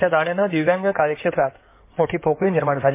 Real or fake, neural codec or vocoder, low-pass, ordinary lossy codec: fake; codec, 16 kHz in and 24 kHz out, 2.2 kbps, FireRedTTS-2 codec; 3.6 kHz; MP3, 24 kbps